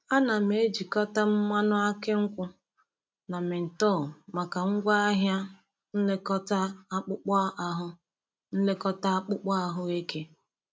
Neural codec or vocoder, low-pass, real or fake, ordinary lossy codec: none; none; real; none